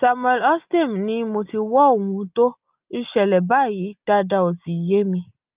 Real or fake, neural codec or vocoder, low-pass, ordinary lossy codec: real; none; 3.6 kHz; Opus, 24 kbps